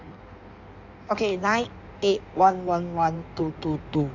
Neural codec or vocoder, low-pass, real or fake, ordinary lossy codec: codec, 16 kHz in and 24 kHz out, 1.1 kbps, FireRedTTS-2 codec; 7.2 kHz; fake; none